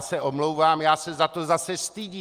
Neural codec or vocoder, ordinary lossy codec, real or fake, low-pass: none; Opus, 32 kbps; real; 14.4 kHz